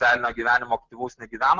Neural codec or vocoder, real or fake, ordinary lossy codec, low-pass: none; real; Opus, 16 kbps; 7.2 kHz